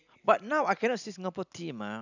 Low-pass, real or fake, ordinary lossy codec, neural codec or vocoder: 7.2 kHz; real; none; none